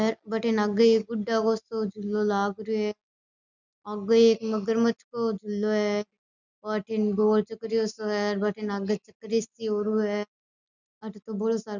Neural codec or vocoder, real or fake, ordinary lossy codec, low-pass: none; real; none; 7.2 kHz